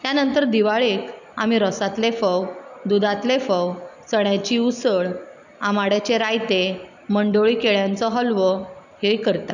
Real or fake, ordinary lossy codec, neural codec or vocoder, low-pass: real; none; none; 7.2 kHz